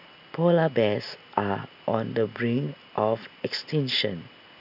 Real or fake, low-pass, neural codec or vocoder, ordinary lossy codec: real; 5.4 kHz; none; none